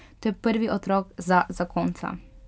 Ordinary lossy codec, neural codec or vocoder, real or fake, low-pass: none; none; real; none